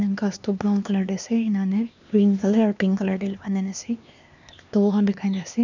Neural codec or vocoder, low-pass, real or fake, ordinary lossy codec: codec, 16 kHz, 2 kbps, X-Codec, HuBERT features, trained on LibriSpeech; 7.2 kHz; fake; none